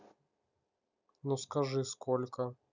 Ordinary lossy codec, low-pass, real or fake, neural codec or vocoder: none; 7.2 kHz; real; none